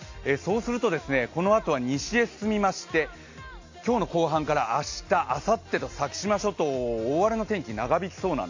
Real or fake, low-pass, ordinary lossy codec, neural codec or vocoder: real; 7.2 kHz; AAC, 48 kbps; none